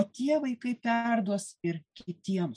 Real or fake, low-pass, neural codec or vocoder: real; 9.9 kHz; none